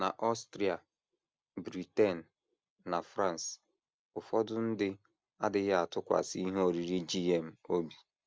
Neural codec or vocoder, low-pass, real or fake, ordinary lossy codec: none; none; real; none